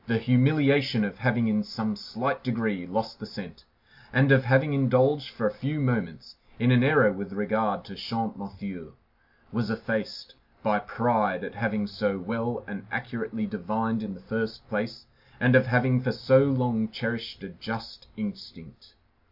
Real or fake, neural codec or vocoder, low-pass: real; none; 5.4 kHz